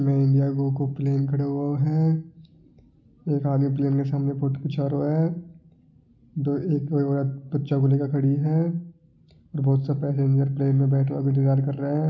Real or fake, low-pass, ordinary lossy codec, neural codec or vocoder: real; 7.2 kHz; none; none